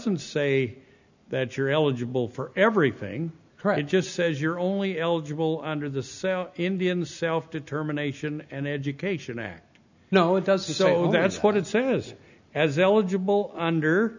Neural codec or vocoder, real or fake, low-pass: none; real; 7.2 kHz